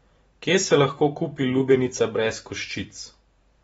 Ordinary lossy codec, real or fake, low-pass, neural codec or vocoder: AAC, 24 kbps; fake; 19.8 kHz; vocoder, 44.1 kHz, 128 mel bands every 512 samples, BigVGAN v2